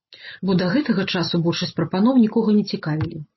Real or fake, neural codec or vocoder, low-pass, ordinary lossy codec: real; none; 7.2 kHz; MP3, 24 kbps